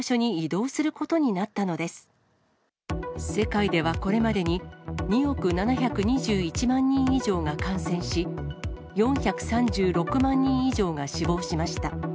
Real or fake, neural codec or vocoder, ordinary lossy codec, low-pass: real; none; none; none